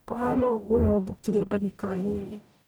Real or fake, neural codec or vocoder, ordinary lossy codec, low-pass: fake; codec, 44.1 kHz, 0.9 kbps, DAC; none; none